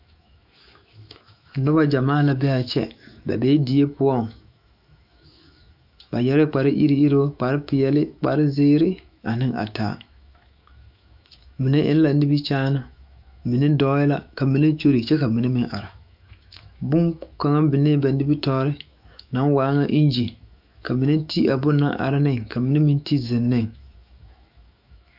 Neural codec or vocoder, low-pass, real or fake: autoencoder, 48 kHz, 128 numbers a frame, DAC-VAE, trained on Japanese speech; 5.4 kHz; fake